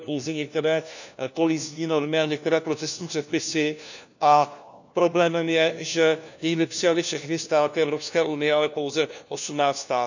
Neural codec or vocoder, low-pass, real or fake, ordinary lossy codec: codec, 16 kHz, 1 kbps, FunCodec, trained on LibriTTS, 50 frames a second; 7.2 kHz; fake; none